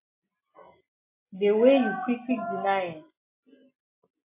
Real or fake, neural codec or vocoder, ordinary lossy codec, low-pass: real; none; MP3, 24 kbps; 3.6 kHz